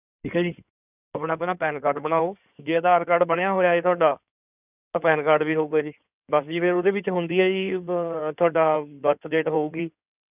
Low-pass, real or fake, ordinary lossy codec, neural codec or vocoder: 3.6 kHz; fake; none; codec, 16 kHz in and 24 kHz out, 2.2 kbps, FireRedTTS-2 codec